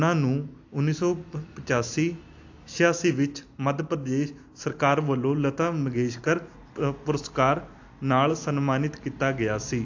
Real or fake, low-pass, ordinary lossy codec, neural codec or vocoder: real; 7.2 kHz; AAC, 48 kbps; none